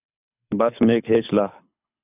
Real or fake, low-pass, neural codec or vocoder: fake; 3.6 kHz; codec, 24 kHz, 6 kbps, HILCodec